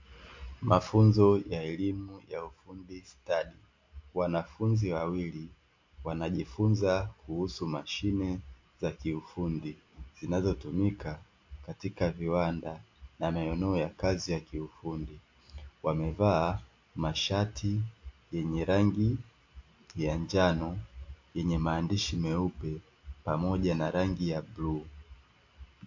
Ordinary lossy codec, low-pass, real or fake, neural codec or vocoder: MP3, 48 kbps; 7.2 kHz; real; none